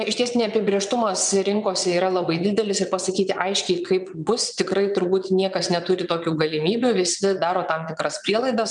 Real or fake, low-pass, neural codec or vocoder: fake; 9.9 kHz; vocoder, 22.05 kHz, 80 mel bands, WaveNeXt